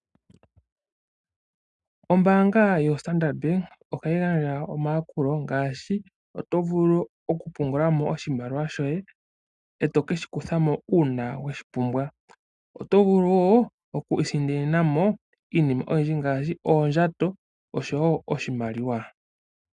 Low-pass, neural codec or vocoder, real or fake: 10.8 kHz; none; real